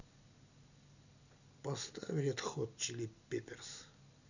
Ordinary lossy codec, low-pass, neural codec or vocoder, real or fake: none; 7.2 kHz; none; real